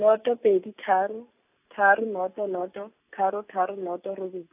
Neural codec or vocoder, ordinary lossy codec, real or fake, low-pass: none; AAC, 32 kbps; real; 3.6 kHz